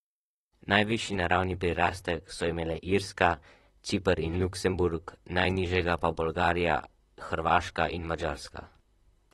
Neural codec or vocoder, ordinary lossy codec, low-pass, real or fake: vocoder, 44.1 kHz, 128 mel bands, Pupu-Vocoder; AAC, 32 kbps; 19.8 kHz; fake